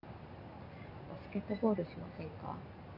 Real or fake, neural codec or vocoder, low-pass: real; none; 5.4 kHz